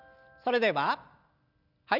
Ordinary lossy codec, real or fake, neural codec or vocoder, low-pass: none; real; none; 5.4 kHz